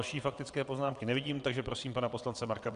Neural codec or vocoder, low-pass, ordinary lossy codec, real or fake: vocoder, 22.05 kHz, 80 mel bands, WaveNeXt; 9.9 kHz; Opus, 64 kbps; fake